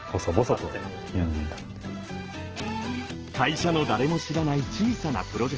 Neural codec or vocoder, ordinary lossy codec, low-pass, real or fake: none; Opus, 16 kbps; 7.2 kHz; real